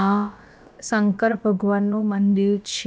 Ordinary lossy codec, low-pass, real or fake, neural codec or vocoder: none; none; fake; codec, 16 kHz, about 1 kbps, DyCAST, with the encoder's durations